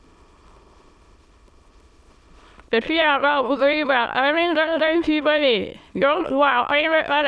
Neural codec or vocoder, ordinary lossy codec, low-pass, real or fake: autoencoder, 22.05 kHz, a latent of 192 numbers a frame, VITS, trained on many speakers; none; none; fake